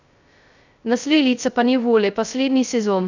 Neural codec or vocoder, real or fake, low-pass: codec, 16 kHz, 0.2 kbps, FocalCodec; fake; 7.2 kHz